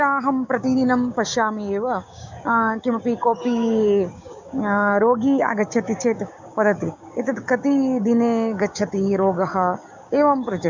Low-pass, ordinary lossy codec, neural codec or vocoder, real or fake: 7.2 kHz; MP3, 64 kbps; codec, 16 kHz, 6 kbps, DAC; fake